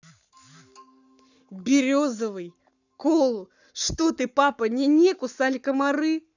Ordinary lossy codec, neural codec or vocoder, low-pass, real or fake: none; codec, 44.1 kHz, 7.8 kbps, Pupu-Codec; 7.2 kHz; fake